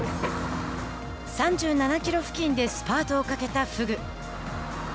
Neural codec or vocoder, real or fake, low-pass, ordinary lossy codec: none; real; none; none